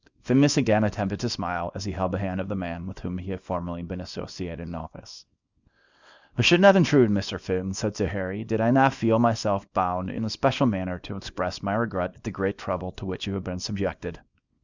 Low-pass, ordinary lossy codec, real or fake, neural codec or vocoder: 7.2 kHz; Opus, 64 kbps; fake; codec, 24 kHz, 0.9 kbps, WavTokenizer, small release